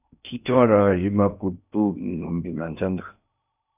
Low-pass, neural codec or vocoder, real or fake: 3.6 kHz; codec, 16 kHz in and 24 kHz out, 0.6 kbps, FocalCodec, streaming, 4096 codes; fake